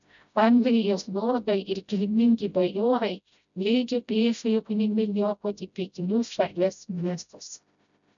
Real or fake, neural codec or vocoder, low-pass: fake; codec, 16 kHz, 0.5 kbps, FreqCodec, smaller model; 7.2 kHz